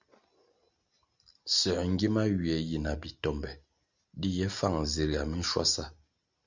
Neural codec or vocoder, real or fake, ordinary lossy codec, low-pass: none; real; Opus, 64 kbps; 7.2 kHz